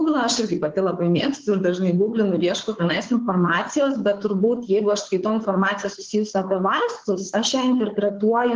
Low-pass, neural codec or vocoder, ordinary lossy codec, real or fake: 7.2 kHz; codec, 16 kHz, 4 kbps, FunCodec, trained on Chinese and English, 50 frames a second; Opus, 16 kbps; fake